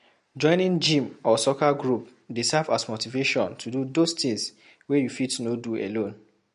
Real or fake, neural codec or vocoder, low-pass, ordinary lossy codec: real; none; 10.8 kHz; MP3, 48 kbps